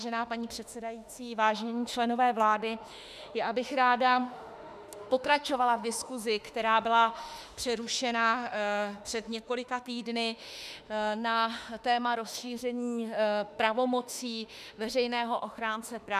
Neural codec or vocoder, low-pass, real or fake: autoencoder, 48 kHz, 32 numbers a frame, DAC-VAE, trained on Japanese speech; 14.4 kHz; fake